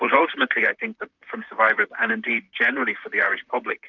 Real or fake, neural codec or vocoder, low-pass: fake; vocoder, 44.1 kHz, 128 mel bands, Pupu-Vocoder; 7.2 kHz